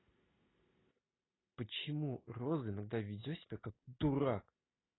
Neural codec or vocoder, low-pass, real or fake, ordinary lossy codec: none; 7.2 kHz; real; AAC, 16 kbps